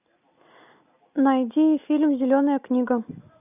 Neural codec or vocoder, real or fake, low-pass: none; real; 3.6 kHz